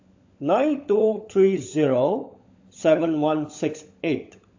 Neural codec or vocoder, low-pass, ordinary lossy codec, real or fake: codec, 16 kHz, 16 kbps, FunCodec, trained on LibriTTS, 50 frames a second; 7.2 kHz; AAC, 48 kbps; fake